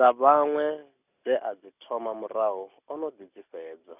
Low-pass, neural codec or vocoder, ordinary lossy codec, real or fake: 3.6 kHz; none; none; real